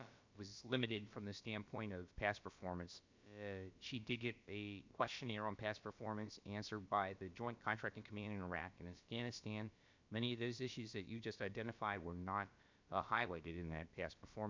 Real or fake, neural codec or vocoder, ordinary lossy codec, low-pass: fake; codec, 16 kHz, about 1 kbps, DyCAST, with the encoder's durations; MP3, 64 kbps; 7.2 kHz